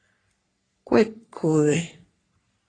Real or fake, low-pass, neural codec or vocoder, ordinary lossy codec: fake; 9.9 kHz; codec, 44.1 kHz, 3.4 kbps, Pupu-Codec; AAC, 32 kbps